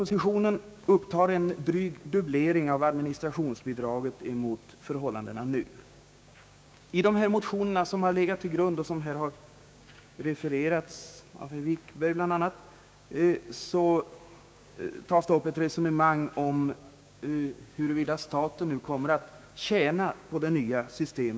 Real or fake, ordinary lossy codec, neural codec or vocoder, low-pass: fake; none; codec, 16 kHz, 6 kbps, DAC; none